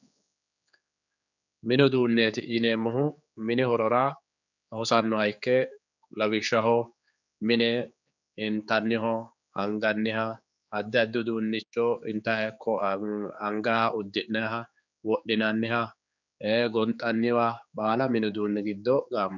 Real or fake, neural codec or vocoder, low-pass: fake; codec, 16 kHz, 4 kbps, X-Codec, HuBERT features, trained on general audio; 7.2 kHz